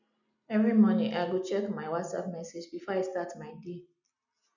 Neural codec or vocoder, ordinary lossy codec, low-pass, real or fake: none; none; 7.2 kHz; real